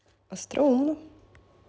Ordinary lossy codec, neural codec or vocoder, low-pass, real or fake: none; none; none; real